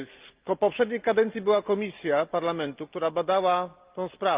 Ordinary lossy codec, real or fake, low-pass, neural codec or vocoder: Opus, 32 kbps; real; 3.6 kHz; none